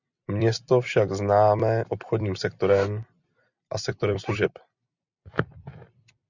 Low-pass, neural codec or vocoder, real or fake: 7.2 kHz; vocoder, 44.1 kHz, 128 mel bands every 256 samples, BigVGAN v2; fake